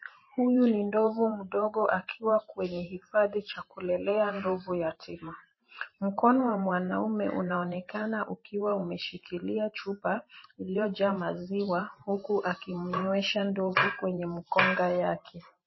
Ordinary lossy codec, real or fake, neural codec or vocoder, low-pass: MP3, 24 kbps; fake; vocoder, 44.1 kHz, 128 mel bands every 512 samples, BigVGAN v2; 7.2 kHz